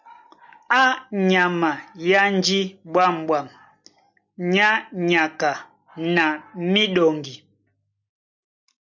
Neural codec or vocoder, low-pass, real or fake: none; 7.2 kHz; real